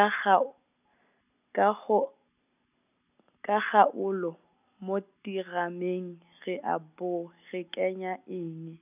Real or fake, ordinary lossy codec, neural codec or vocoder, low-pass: real; none; none; 3.6 kHz